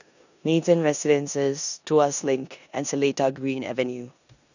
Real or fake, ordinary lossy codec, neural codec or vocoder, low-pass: fake; none; codec, 16 kHz in and 24 kHz out, 0.9 kbps, LongCat-Audio-Codec, four codebook decoder; 7.2 kHz